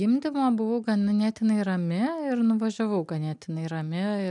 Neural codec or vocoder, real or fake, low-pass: none; real; 10.8 kHz